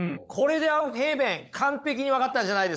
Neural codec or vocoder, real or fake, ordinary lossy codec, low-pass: codec, 16 kHz, 16 kbps, FunCodec, trained on LibriTTS, 50 frames a second; fake; none; none